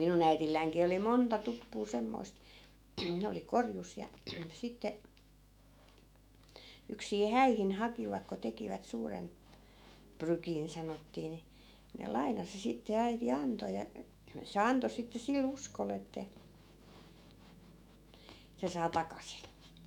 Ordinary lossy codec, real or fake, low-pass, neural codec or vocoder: none; fake; 19.8 kHz; autoencoder, 48 kHz, 128 numbers a frame, DAC-VAE, trained on Japanese speech